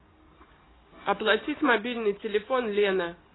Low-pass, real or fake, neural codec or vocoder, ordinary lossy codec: 7.2 kHz; real; none; AAC, 16 kbps